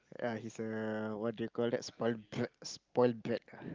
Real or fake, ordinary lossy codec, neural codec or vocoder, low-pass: real; Opus, 24 kbps; none; 7.2 kHz